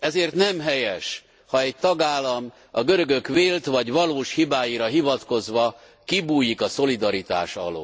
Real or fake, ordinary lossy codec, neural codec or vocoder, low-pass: real; none; none; none